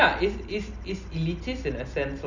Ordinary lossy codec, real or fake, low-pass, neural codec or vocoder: none; real; 7.2 kHz; none